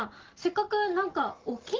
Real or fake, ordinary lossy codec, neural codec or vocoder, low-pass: real; Opus, 16 kbps; none; 7.2 kHz